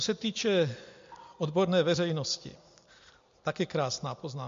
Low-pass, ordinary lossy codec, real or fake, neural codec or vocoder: 7.2 kHz; MP3, 48 kbps; real; none